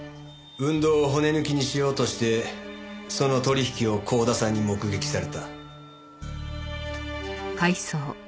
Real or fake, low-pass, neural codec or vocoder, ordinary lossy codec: real; none; none; none